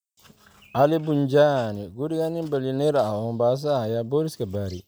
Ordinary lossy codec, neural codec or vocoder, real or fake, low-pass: none; none; real; none